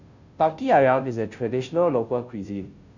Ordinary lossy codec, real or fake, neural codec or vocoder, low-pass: none; fake; codec, 16 kHz, 0.5 kbps, FunCodec, trained on Chinese and English, 25 frames a second; 7.2 kHz